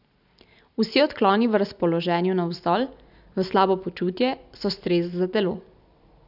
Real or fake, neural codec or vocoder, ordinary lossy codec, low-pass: real; none; none; 5.4 kHz